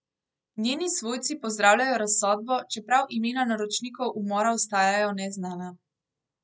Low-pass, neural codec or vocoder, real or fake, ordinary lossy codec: none; none; real; none